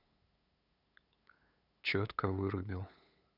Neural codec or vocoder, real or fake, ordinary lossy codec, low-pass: codec, 16 kHz, 8 kbps, FunCodec, trained on LibriTTS, 25 frames a second; fake; none; 5.4 kHz